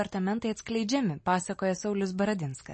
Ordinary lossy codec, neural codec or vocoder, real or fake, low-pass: MP3, 32 kbps; none; real; 10.8 kHz